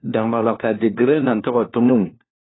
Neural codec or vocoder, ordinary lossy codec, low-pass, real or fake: codec, 16 kHz, 1 kbps, FunCodec, trained on LibriTTS, 50 frames a second; AAC, 16 kbps; 7.2 kHz; fake